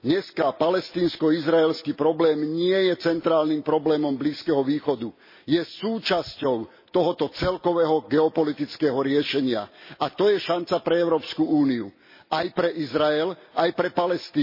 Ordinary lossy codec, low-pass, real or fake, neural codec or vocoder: MP3, 24 kbps; 5.4 kHz; real; none